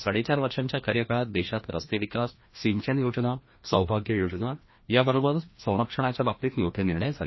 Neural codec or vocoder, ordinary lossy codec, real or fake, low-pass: codec, 16 kHz, 1 kbps, FreqCodec, larger model; MP3, 24 kbps; fake; 7.2 kHz